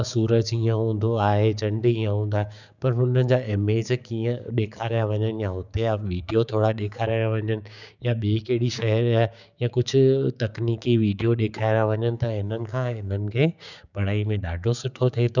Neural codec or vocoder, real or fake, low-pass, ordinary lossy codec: codec, 16 kHz, 6 kbps, DAC; fake; 7.2 kHz; none